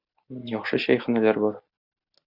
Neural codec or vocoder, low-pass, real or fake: none; 5.4 kHz; real